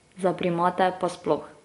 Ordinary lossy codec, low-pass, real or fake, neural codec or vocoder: AAC, 48 kbps; 10.8 kHz; real; none